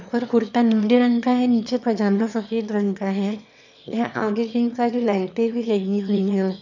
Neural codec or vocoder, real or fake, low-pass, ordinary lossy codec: autoencoder, 22.05 kHz, a latent of 192 numbers a frame, VITS, trained on one speaker; fake; 7.2 kHz; none